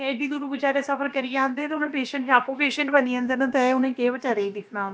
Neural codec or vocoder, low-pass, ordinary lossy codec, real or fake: codec, 16 kHz, about 1 kbps, DyCAST, with the encoder's durations; none; none; fake